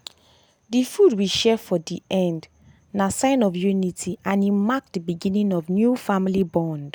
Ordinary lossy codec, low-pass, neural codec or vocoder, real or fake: none; none; none; real